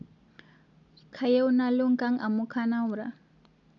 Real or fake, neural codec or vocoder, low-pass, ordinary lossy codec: real; none; 7.2 kHz; none